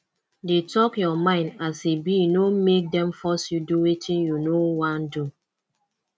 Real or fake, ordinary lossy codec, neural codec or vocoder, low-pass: real; none; none; none